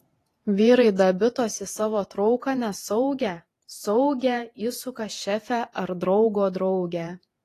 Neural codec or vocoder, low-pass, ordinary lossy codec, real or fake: vocoder, 48 kHz, 128 mel bands, Vocos; 14.4 kHz; AAC, 48 kbps; fake